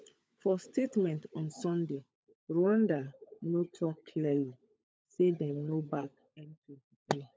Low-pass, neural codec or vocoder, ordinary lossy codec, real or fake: none; codec, 16 kHz, 16 kbps, FunCodec, trained on LibriTTS, 50 frames a second; none; fake